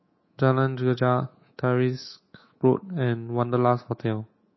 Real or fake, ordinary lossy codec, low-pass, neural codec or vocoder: fake; MP3, 24 kbps; 7.2 kHz; codec, 24 kHz, 3.1 kbps, DualCodec